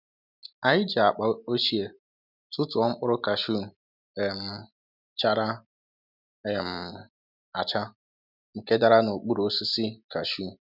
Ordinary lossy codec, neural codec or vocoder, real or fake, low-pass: none; none; real; 5.4 kHz